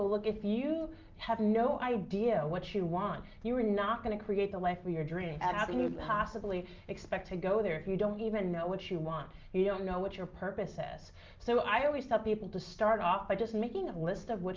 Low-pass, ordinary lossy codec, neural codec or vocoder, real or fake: 7.2 kHz; Opus, 32 kbps; none; real